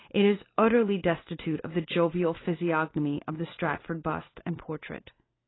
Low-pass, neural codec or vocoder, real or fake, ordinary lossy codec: 7.2 kHz; none; real; AAC, 16 kbps